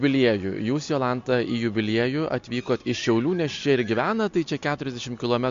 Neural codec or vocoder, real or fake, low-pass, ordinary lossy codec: none; real; 7.2 kHz; MP3, 48 kbps